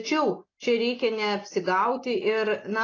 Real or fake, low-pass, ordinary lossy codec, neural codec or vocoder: real; 7.2 kHz; AAC, 32 kbps; none